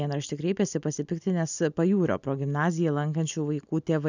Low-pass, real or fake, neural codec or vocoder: 7.2 kHz; real; none